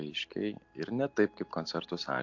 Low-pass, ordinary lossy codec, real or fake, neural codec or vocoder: 7.2 kHz; AAC, 48 kbps; real; none